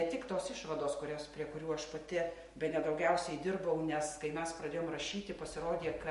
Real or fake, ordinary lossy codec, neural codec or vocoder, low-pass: real; MP3, 48 kbps; none; 14.4 kHz